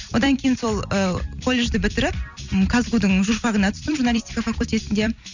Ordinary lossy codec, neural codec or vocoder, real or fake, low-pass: none; vocoder, 44.1 kHz, 128 mel bands every 256 samples, BigVGAN v2; fake; 7.2 kHz